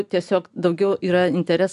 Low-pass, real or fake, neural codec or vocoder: 10.8 kHz; real; none